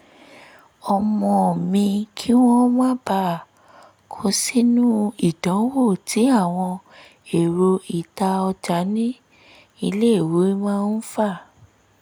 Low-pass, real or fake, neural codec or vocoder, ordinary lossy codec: 19.8 kHz; real; none; none